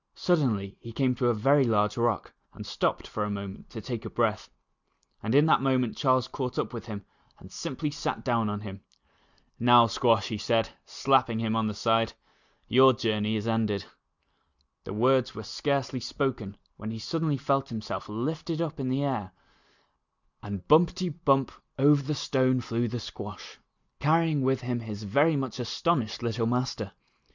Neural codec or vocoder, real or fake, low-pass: none; real; 7.2 kHz